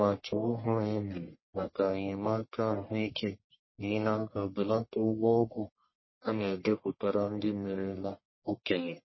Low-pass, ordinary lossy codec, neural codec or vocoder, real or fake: 7.2 kHz; MP3, 24 kbps; codec, 44.1 kHz, 1.7 kbps, Pupu-Codec; fake